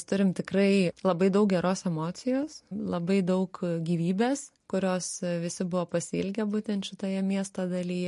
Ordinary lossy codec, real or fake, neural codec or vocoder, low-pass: MP3, 48 kbps; real; none; 14.4 kHz